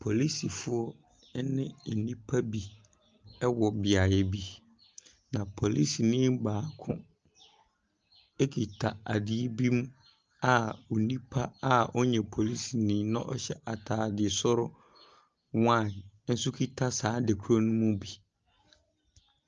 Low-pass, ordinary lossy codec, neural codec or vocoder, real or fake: 7.2 kHz; Opus, 24 kbps; none; real